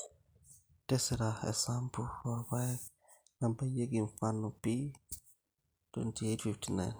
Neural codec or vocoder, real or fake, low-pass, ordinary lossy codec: none; real; none; none